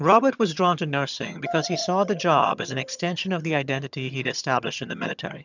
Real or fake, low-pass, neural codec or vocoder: fake; 7.2 kHz; vocoder, 22.05 kHz, 80 mel bands, HiFi-GAN